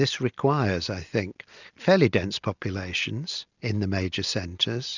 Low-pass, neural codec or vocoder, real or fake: 7.2 kHz; none; real